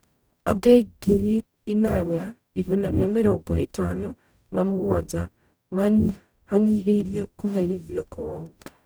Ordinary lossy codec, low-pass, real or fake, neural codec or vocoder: none; none; fake; codec, 44.1 kHz, 0.9 kbps, DAC